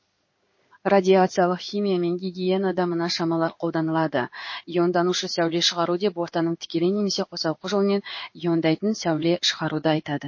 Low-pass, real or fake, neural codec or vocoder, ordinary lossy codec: 7.2 kHz; fake; codec, 16 kHz in and 24 kHz out, 1 kbps, XY-Tokenizer; MP3, 32 kbps